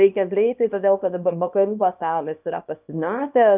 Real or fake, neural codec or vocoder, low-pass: fake; codec, 24 kHz, 0.9 kbps, WavTokenizer, small release; 3.6 kHz